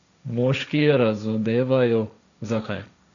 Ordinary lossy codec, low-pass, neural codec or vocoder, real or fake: none; 7.2 kHz; codec, 16 kHz, 1.1 kbps, Voila-Tokenizer; fake